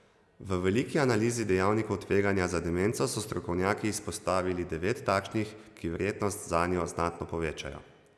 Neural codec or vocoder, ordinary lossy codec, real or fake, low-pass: none; none; real; none